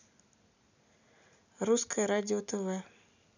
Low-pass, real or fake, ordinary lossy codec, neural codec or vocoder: 7.2 kHz; real; none; none